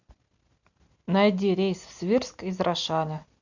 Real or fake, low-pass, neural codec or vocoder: real; 7.2 kHz; none